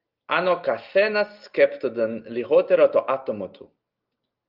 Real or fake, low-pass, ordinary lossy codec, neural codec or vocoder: real; 5.4 kHz; Opus, 24 kbps; none